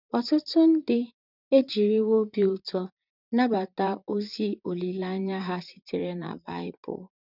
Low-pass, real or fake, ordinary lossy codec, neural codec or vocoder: 5.4 kHz; fake; none; vocoder, 44.1 kHz, 128 mel bands, Pupu-Vocoder